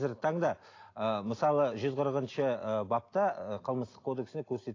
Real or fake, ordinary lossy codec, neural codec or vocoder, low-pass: real; AAC, 32 kbps; none; 7.2 kHz